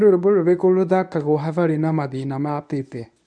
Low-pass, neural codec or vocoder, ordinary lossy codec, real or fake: 9.9 kHz; codec, 24 kHz, 0.9 kbps, WavTokenizer, medium speech release version 1; none; fake